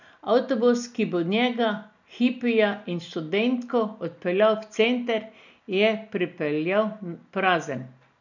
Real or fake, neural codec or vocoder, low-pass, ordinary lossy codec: real; none; 7.2 kHz; none